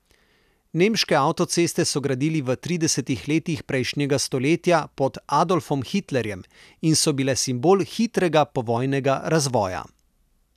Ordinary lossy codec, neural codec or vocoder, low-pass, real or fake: none; none; 14.4 kHz; real